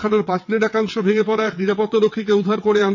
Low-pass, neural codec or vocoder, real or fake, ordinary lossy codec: 7.2 kHz; vocoder, 22.05 kHz, 80 mel bands, WaveNeXt; fake; none